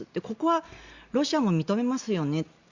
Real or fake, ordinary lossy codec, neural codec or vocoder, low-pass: real; Opus, 64 kbps; none; 7.2 kHz